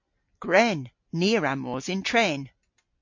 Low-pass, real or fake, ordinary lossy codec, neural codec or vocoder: 7.2 kHz; real; MP3, 48 kbps; none